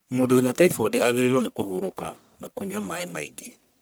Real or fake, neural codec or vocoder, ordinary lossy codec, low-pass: fake; codec, 44.1 kHz, 1.7 kbps, Pupu-Codec; none; none